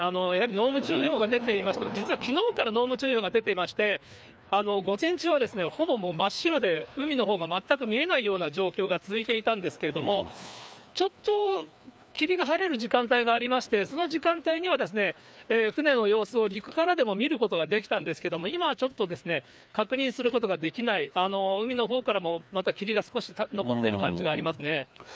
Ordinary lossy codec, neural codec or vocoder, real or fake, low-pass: none; codec, 16 kHz, 2 kbps, FreqCodec, larger model; fake; none